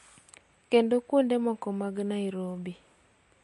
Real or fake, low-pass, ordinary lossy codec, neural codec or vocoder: real; 14.4 kHz; MP3, 48 kbps; none